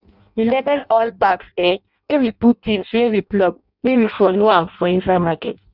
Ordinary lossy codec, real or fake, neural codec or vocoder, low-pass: none; fake; codec, 16 kHz in and 24 kHz out, 0.6 kbps, FireRedTTS-2 codec; 5.4 kHz